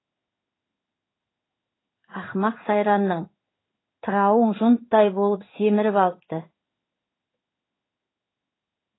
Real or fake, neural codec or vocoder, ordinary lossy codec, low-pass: fake; codec, 16 kHz in and 24 kHz out, 1 kbps, XY-Tokenizer; AAC, 16 kbps; 7.2 kHz